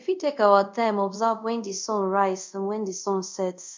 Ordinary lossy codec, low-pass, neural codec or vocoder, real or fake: MP3, 64 kbps; 7.2 kHz; codec, 24 kHz, 0.5 kbps, DualCodec; fake